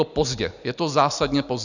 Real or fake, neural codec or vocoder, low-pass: real; none; 7.2 kHz